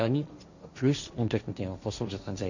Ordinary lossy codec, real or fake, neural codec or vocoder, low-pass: none; fake; codec, 16 kHz, 1.1 kbps, Voila-Tokenizer; 7.2 kHz